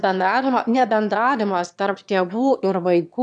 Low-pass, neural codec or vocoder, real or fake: 9.9 kHz; autoencoder, 22.05 kHz, a latent of 192 numbers a frame, VITS, trained on one speaker; fake